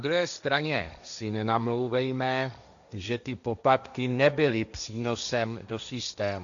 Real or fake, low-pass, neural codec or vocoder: fake; 7.2 kHz; codec, 16 kHz, 1.1 kbps, Voila-Tokenizer